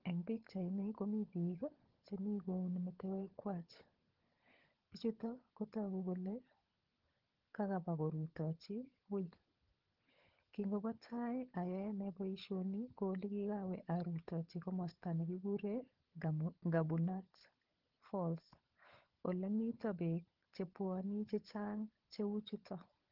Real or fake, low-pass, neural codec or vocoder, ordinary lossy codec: fake; 5.4 kHz; codec, 16 kHz, 16 kbps, FunCodec, trained on LibriTTS, 50 frames a second; Opus, 16 kbps